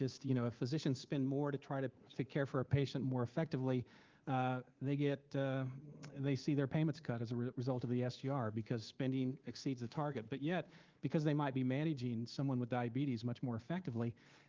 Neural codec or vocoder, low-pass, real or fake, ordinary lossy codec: codec, 24 kHz, 3.1 kbps, DualCodec; 7.2 kHz; fake; Opus, 32 kbps